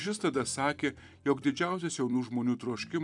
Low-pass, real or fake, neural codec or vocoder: 10.8 kHz; fake; vocoder, 44.1 kHz, 128 mel bands every 256 samples, BigVGAN v2